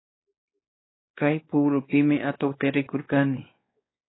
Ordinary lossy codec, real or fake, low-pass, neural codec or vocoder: AAC, 16 kbps; fake; 7.2 kHz; codec, 16 kHz, 2 kbps, X-Codec, WavLM features, trained on Multilingual LibriSpeech